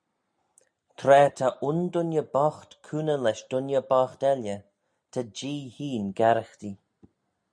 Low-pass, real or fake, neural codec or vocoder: 9.9 kHz; real; none